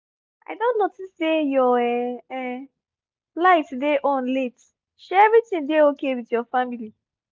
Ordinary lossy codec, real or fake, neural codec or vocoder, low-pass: none; real; none; none